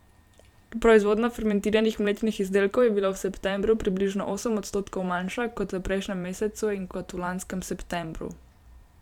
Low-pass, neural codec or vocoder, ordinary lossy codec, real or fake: 19.8 kHz; vocoder, 44.1 kHz, 128 mel bands every 512 samples, BigVGAN v2; none; fake